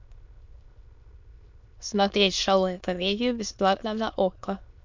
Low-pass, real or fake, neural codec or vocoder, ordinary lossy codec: 7.2 kHz; fake; autoencoder, 22.05 kHz, a latent of 192 numbers a frame, VITS, trained on many speakers; MP3, 64 kbps